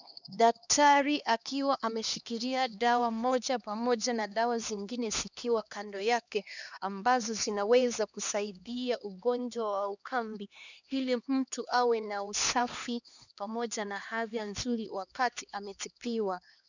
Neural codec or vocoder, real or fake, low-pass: codec, 16 kHz, 2 kbps, X-Codec, HuBERT features, trained on LibriSpeech; fake; 7.2 kHz